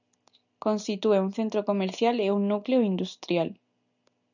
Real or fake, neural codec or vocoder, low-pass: real; none; 7.2 kHz